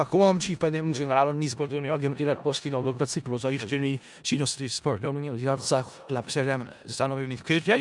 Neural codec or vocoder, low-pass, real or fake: codec, 16 kHz in and 24 kHz out, 0.4 kbps, LongCat-Audio-Codec, four codebook decoder; 10.8 kHz; fake